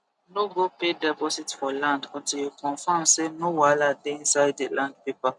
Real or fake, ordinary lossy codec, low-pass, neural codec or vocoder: real; none; 10.8 kHz; none